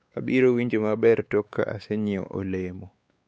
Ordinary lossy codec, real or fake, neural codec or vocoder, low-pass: none; fake; codec, 16 kHz, 4 kbps, X-Codec, WavLM features, trained on Multilingual LibriSpeech; none